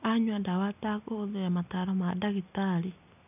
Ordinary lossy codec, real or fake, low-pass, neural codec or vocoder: none; real; 3.6 kHz; none